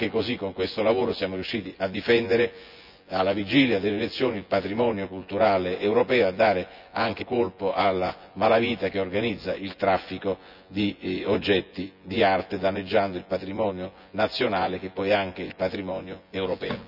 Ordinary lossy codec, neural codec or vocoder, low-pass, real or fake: none; vocoder, 24 kHz, 100 mel bands, Vocos; 5.4 kHz; fake